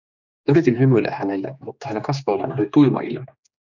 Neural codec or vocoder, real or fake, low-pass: codec, 16 kHz, 2 kbps, X-Codec, HuBERT features, trained on general audio; fake; 7.2 kHz